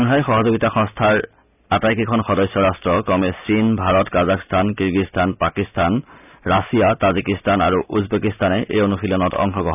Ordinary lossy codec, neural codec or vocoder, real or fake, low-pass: none; none; real; 3.6 kHz